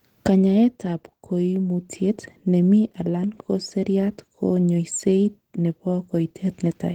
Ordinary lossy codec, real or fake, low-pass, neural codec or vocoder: Opus, 16 kbps; real; 19.8 kHz; none